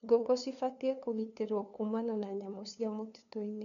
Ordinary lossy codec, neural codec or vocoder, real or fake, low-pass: none; codec, 16 kHz, 2 kbps, FunCodec, trained on LibriTTS, 25 frames a second; fake; 7.2 kHz